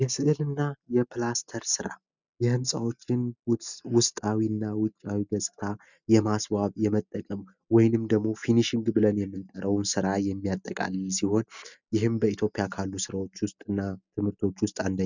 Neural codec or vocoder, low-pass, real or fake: none; 7.2 kHz; real